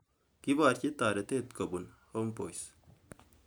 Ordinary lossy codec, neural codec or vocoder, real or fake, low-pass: none; none; real; none